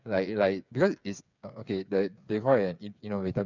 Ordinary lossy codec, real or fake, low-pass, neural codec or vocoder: none; fake; 7.2 kHz; codec, 16 kHz, 8 kbps, FreqCodec, smaller model